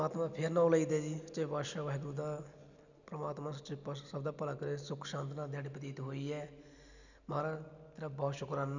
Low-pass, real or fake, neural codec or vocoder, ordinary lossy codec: 7.2 kHz; real; none; none